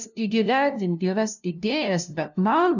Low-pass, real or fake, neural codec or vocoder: 7.2 kHz; fake; codec, 16 kHz, 0.5 kbps, FunCodec, trained on LibriTTS, 25 frames a second